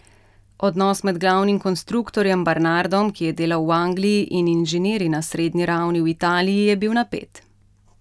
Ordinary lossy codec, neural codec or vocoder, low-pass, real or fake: none; none; none; real